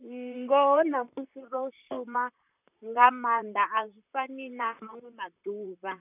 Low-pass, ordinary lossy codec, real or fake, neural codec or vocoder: 3.6 kHz; none; fake; vocoder, 44.1 kHz, 128 mel bands, Pupu-Vocoder